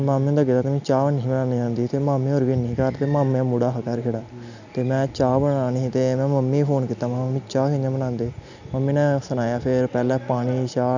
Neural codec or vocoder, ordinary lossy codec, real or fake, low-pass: none; none; real; 7.2 kHz